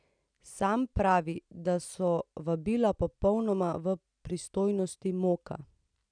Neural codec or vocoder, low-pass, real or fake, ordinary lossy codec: none; 9.9 kHz; real; none